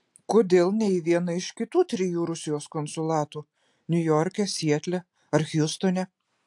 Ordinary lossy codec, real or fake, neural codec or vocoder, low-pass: AAC, 64 kbps; real; none; 10.8 kHz